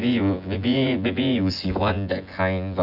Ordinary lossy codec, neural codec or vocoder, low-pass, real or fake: none; vocoder, 24 kHz, 100 mel bands, Vocos; 5.4 kHz; fake